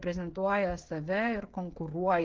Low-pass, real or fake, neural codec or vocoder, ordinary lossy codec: 7.2 kHz; fake; codec, 16 kHz, 16 kbps, FreqCodec, smaller model; Opus, 16 kbps